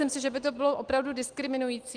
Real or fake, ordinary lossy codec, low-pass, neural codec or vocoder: real; Opus, 24 kbps; 9.9 kHz; none